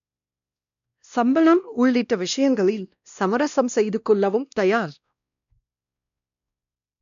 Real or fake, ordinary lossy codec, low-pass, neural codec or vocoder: fake; none; 7.2 kHz; codec, 16 kHz, 1 kbps, X-Codec, WavLM features, trained on Multilingual LibriSpeech